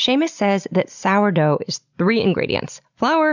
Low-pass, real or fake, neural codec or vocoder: 7.2 kHz; real; none